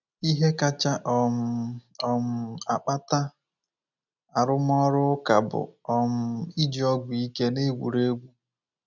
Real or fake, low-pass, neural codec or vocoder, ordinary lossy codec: real; 7.2 kHz; none; none